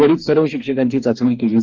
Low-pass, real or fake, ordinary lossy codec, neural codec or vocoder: 7.2 kHz; fake; Opus, 24 kbps; codec, 44.1 kHz, 2.6 kbps, DAC